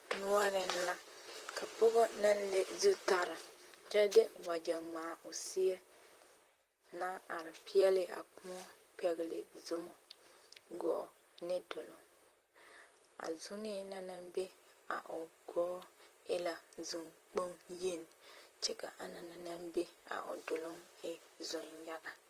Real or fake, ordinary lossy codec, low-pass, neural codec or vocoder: fake; Opus, 24 kbps; 14.4 kHz; vocoder, 44.1 kHz, 128 mel bands, Pupu-Vocoder